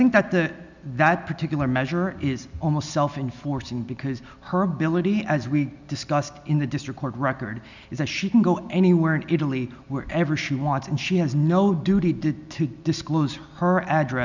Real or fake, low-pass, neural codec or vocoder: real; 7.2 kHz; none